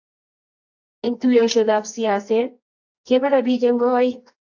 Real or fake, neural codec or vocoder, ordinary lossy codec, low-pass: fake; codec, 24 kHz, 0.9 kbps, WavTokenizer, medium music audio release; AAC, 48 kbps; 7.2 kHz